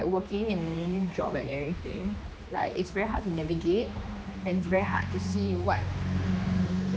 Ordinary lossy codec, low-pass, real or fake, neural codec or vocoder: none; none; fake; codec, 16 kHz, 2 kbps, X-Codec, HuBERT features, trained on general audio